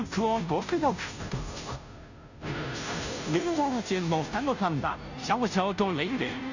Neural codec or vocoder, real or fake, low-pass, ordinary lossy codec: codec, 16 kHz, 0.5 kbps, FunCodec, trained on Chinese and English, 25 frames a second; fake; 7.2 kHz; none